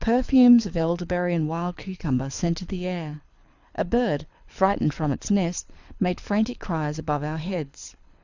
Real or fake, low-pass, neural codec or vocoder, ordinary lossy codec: fake; 7.2 kHz; codec, 24 kHz, 6 kbps, HILCodec; Opus, 64 kbps